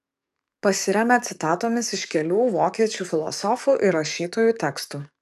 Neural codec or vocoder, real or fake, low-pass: codec, 44.1 kHz, 7.8 kbps, DAC; fake; 14.4 kHz